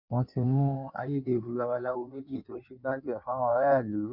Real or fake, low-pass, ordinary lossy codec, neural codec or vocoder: fake; 5.4 kHz; none; codec, 16 kHz in and 24 kHz out, 1.1 kbps, FireRedTTS-2 codec